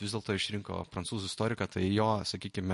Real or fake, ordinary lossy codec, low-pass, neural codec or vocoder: real; MP3, 48 kbps; 14.4 kHz; none